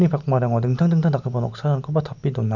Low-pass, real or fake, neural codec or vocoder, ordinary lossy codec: 7.2 kHz; real; none; none